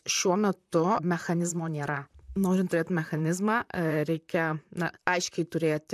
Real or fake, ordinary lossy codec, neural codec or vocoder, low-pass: fake; MP3, 96 kbps; vocoder, 44.1 kHz, 128 mel bands, Pupu-Vocoder; 14.4 kHz